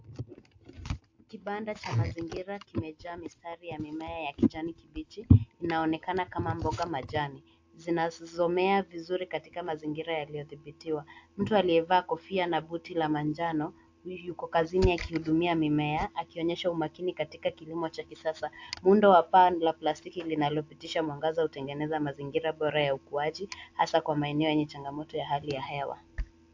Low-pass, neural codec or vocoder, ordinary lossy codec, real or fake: 7.2 kHz; none; AAC, 48 kbps; real